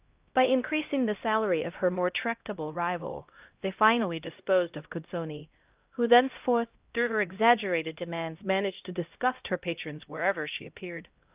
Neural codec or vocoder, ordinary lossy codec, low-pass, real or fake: codec, 16 kHz, 0.5 kbps, X-Codec, HuBERT features, trained on LibriSpeech; Opus, 24 kbps; 3.6 kHz; fake